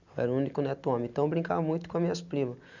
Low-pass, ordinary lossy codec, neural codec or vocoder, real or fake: 7.2 kHz; none; none; real